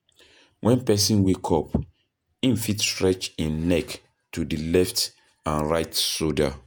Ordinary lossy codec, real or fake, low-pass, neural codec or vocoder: none; fake; none; vocoder, 48 kHz, 128 mel bands, Vocos